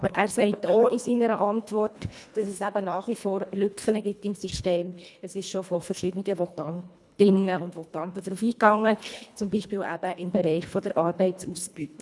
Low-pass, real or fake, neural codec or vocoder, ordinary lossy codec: none; fake; codec, 24 kHz, 1.5 kbps, HILCodec; none